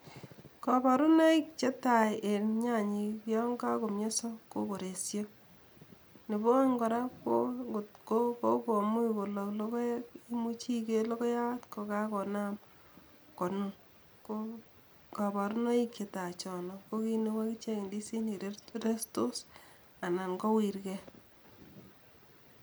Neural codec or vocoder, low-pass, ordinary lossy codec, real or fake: none; none; none; real